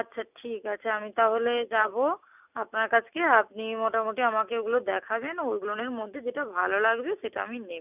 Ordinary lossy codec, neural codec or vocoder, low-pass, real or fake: none; none; 3.6 kHz; real